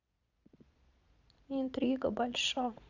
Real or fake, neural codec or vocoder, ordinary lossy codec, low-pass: fake; vocoder, 22.05 kHz, 80 mel bands, Vocos; none; 7.2 kHz